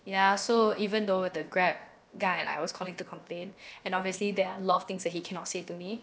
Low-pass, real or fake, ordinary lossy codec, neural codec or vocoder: none; fake; none; codec, 16 kHz, about 1 kbps, DyCAST, with the encoder's durations